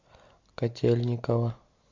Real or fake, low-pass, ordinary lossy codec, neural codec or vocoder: real; 7.2 kHz; MP3, 64 kbps; none